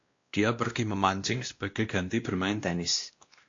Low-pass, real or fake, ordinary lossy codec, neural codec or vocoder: 7.2 kHz; fake; AAC, 48 kbps; codec, 16 kHz, 1 kbps, X-Codec, WavLM features, trained on Multilingual LibriSpeech